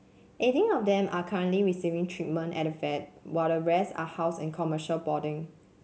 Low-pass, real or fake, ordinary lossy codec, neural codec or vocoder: none; real; none; none